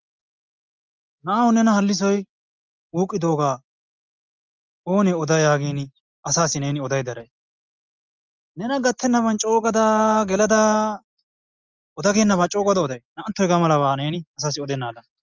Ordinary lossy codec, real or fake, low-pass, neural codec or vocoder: Opus, 24 kbps; real; 7.2 kHz; none